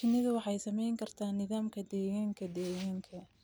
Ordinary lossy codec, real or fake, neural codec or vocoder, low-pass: none; real; none; none